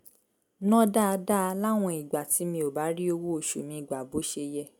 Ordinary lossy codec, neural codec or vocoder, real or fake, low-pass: none; none; real; none